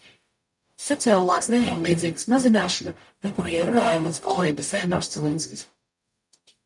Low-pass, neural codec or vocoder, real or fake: 10.8 kHz; codec, 44.1 kHz, 0.9 kbps, DAC; fake